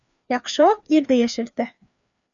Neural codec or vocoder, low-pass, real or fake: codec, 16 kHz, 2 kbps, FreqCodec, larger model; 7.2 kHz; fake